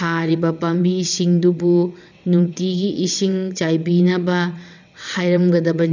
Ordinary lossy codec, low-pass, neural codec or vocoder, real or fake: none; 7.2 kHz; vocoder, 22.05 kHz, 80 mel bands, WaveNeXt; fake